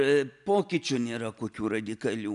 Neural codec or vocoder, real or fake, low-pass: none; real; 10.8 kHz